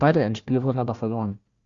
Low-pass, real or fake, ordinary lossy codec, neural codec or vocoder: 7.2 kHz; fake; Opus, 64 kbps; codec, 16 kHz, 1 kbps, FunCodec, trained on Chinese and English, 50 frames a second